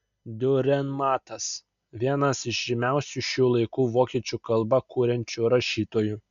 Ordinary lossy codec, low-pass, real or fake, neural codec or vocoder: MP3, 96 kbps; 7.2 kHz; real; none